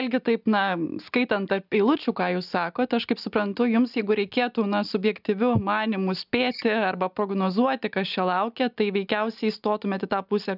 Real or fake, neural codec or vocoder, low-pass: real; none; 5.4 kHz